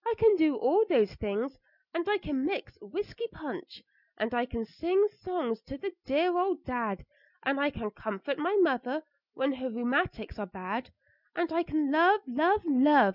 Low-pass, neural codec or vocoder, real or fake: 5.4 kHz; none; real